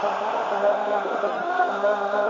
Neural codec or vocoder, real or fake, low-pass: codec, 16 kHz in and 24 kHz out, 0.4 kbps, LongCat-Audio-Codec, two codebook decoder; fake; 7.2 kHz